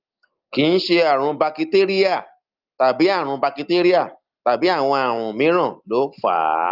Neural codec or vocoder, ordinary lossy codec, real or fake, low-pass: none; Opus, 32 kbps; real; 5.4 kHz